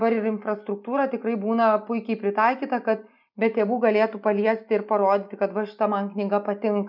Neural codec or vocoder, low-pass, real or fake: none; 5.4 kHz; real